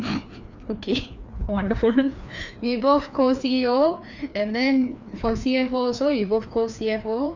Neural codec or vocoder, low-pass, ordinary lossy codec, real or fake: codec, 16 kHz, 2 kbps, FreqCodec, larger model; 7.2 kHz; none; fake